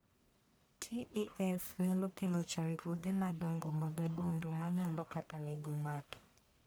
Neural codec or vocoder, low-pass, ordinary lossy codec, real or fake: codec, 44.1 kHz, 1.7 kbps, Pupu-Codec; none; none; fake